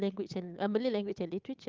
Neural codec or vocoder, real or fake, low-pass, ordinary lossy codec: codec, 16 kHz, 8 kbps, FunCodec, trained on Chinese and English, 25 frames a second; fake; 7.2 kHz; Opus, 24 kbps